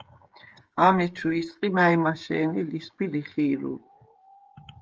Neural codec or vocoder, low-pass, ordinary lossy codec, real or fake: codec, 16 kHz, 16 kbps, FreqCodec, smaller model; 7.2 kHz; Opus, 24 kbps; fake